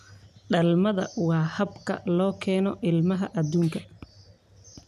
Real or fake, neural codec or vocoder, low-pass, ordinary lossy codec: real; none; 14.4 kHz; none